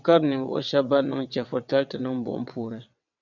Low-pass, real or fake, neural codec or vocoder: 7.2 kHz; fake; vocoder, 22.05 kHz, 80 mel bands, WaveNeXt